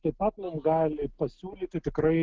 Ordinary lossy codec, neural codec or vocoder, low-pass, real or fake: Opus, 16 kbps; none; 7.2 kHz; real